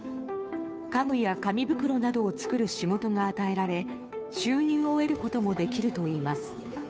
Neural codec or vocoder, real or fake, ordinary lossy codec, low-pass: codec, 16 kHz, 2 kbps, FunCodec, trained on Chinese and English, 25 frames a second; fake; none; none